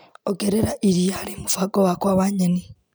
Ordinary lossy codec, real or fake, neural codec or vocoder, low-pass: none; real; none; none